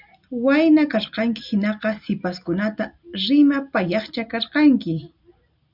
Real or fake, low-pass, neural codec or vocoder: real; 5.4 kHz; none